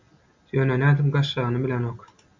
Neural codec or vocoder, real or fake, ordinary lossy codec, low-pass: none; real; MP3, 64 kbps; 7.2 kHz